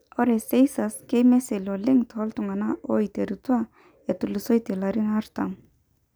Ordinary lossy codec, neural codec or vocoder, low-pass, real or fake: none; none; none; real